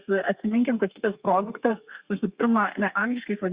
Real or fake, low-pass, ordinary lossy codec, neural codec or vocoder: fake; 3.6 kHz; Opus, 64 kbps; codec, 32 kHz, 1.9 kbps, SNAC